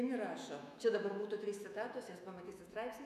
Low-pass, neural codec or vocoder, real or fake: 14.4 kHz; autoencoder, 48 kHz, 128 numbers a frame, DAC-VAE, trained on Japanese speech; fake